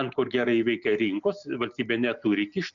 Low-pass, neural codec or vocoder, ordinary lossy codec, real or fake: 7.2 kHz; none; MP3, 64 kbps; real